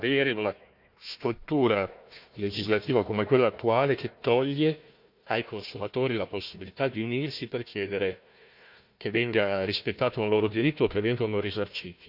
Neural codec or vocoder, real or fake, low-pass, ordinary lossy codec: codec, 16 kHz, 1 kbps, FunCodec, trained on Chinese and English, 50 frames a second; fake; 5.4 kHz; none